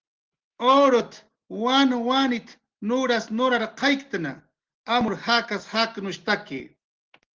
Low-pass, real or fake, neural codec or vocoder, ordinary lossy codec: 7.2 kHz; real; none; Opus, 16 kbps